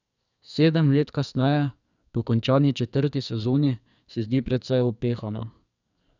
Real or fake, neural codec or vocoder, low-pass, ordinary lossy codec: fake; codec, 44.1 kHz, 2.6 kbps, SNAC; 7.2 kHz; none